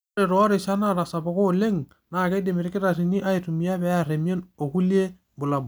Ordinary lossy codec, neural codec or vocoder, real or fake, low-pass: none; none; real; none